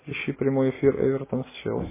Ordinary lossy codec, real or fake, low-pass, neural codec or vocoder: MP3, 16 kbps; real; 3.6 kHz; none